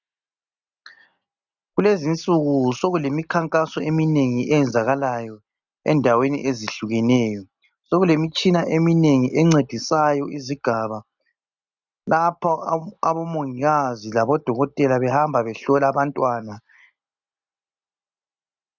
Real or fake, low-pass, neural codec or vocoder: real; 7.2 kHz; none